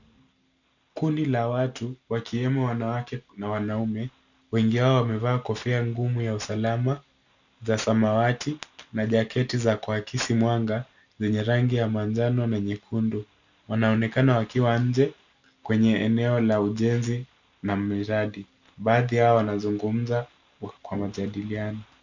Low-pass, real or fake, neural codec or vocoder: 7.2 kHz; real; none